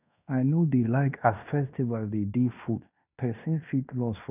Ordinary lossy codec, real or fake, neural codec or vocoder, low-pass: none; fake; codec, 16 kHz in and 24 kHz out, 0.9 kbps, LongCat-Audio-Codec, fine tuned four codebook decoder; 3.6 kHz